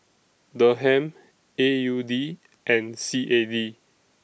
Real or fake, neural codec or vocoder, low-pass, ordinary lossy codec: real; none; none; none